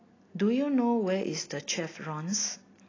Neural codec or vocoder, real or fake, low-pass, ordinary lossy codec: none; real; 7.2 kHz; AAC, 32 kbps